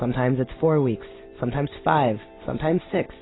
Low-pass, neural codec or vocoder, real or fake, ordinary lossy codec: 7.2 kHz; none; real; AAC, 16 kbps